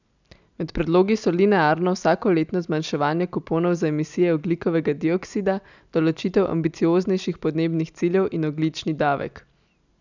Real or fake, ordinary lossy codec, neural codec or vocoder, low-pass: real; none; none; 7.2 kHz